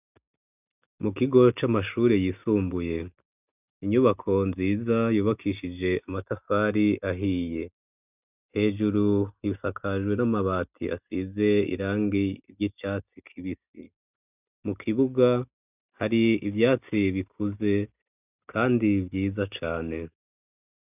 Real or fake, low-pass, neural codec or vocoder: real; 3.6 kHz; none